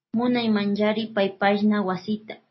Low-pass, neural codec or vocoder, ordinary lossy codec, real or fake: 7.2 kHz; none; MP3, 24 kbps; real